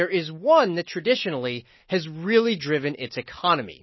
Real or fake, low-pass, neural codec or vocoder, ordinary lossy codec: real; 7.2 kHz; none; MP3, 24 kbps